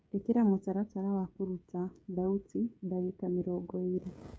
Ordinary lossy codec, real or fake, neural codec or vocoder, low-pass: none; fake; codec, 16 kHz, 16 kbps, FreqCodec, smaller model; none